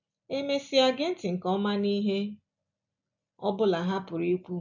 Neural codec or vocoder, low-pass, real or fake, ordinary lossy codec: none; 7.2 kHz; real; none